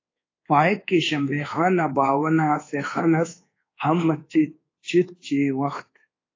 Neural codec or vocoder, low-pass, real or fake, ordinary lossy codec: codec, 24 kHz, 1.2 kbps, DualCodec; 7.2 kHz; fake; AAC, 32 kbps